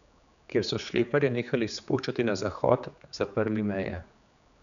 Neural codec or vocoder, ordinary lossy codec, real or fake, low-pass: codec, 16 kHz, 4 kbps, X-Codec, HuBERT features, trained on general audio; none; fake; 7.2 kHz